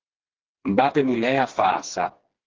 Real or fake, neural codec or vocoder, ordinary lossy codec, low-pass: fake; codec, 16 kHz, 2 kbps, FreqCodec, smaller model; Opus, 16 kbps; 7.2 kHz